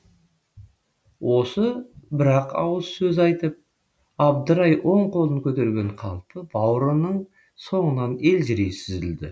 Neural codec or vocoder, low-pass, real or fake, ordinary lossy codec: none; none; real; none